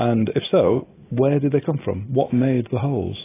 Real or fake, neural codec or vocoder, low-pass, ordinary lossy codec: real; none; 3.6 kHz; AAC, 16 kbps